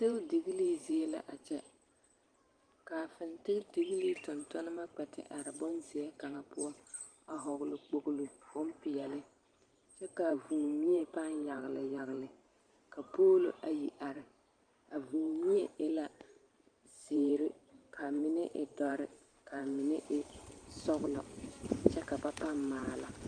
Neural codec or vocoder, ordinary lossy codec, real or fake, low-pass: vocoder, 44.1 kHz, 128 mel bands every 512 samples, BigVGAN v2; Opus, 32 kbps; fake; 9.9 kHz